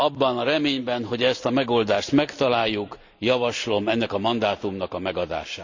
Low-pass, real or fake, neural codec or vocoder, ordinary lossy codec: 7.2 kHz; real; none; MP3, 64 kbps